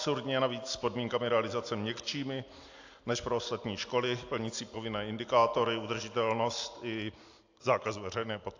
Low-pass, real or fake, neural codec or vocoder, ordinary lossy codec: 7.2 kHz; real; none; AAC, 48 kbps